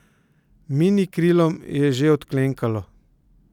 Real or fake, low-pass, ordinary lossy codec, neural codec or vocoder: real; 19.8 kHz; none; none